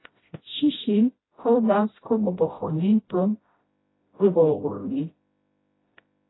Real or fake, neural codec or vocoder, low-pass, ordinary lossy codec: fake; codec, 16 kHz, 0.5 kbps, FreqCodec, smaller model; 7.2 kHz; AAC, 16 kbps